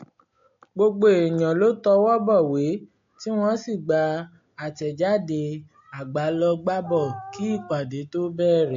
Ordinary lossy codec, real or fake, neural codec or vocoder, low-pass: AAC, 48 kbps; real; none; 7.2 kHz